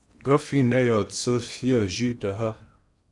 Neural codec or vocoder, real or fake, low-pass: codec, 16 kHz in and 24 kHz out, 0.8 kbps, FocalCodec, streaming, 65536 codes; fake; 10.8 kHz